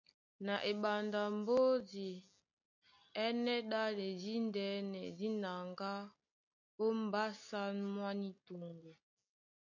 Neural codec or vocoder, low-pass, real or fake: none; 7.2 kHz; real